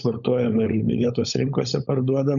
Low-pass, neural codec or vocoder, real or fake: 7.2 kHz; codec, 16 kHz, 16 kbps, FunCodec, trained on LibriTTS, 50 frames a second; fake